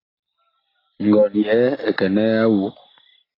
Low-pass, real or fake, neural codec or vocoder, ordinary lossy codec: 5.4 kHz; real; none; AAC, 24 kbps